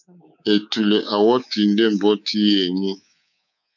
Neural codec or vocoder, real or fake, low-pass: codec, 24 kHz, 3.1 kbps, DualCodec; fake; 7.2 kHz